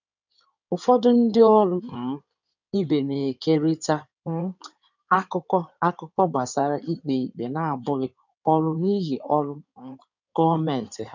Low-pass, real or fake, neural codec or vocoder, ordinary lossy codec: 7.2 kHz; fake; codec, 16 kHz in and 24 kHz out, 2.2 kbps, FireRedTTS-2 codec; none